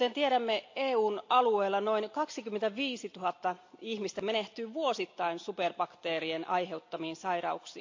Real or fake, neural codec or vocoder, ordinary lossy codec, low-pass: real; none; AAC, 48 kbps; 7.2 kHz